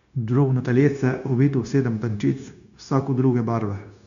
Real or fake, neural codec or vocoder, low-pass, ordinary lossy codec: fake; codec, 16 kHz, 0.9 kbps, LongCat-Audio-Codec; 7.2 kHz; none